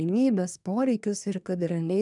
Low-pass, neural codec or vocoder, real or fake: 10.8 kHz; codec, 24 kHz, 1 kbps, SNAC; fake